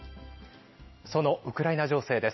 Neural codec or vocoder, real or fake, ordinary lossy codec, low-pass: none; real; MP3, 24 kbps; 7.2 kHz